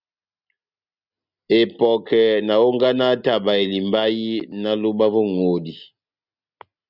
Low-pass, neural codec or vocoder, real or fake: 5.4 kHz; none; real